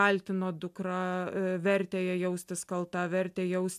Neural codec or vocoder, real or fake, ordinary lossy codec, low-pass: none; real; AAC, 96 kbps; 14.4 kHz